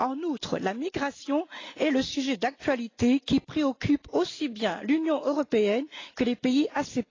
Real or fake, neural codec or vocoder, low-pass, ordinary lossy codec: real; none; 7.2 kHz; AAC, 32 kbps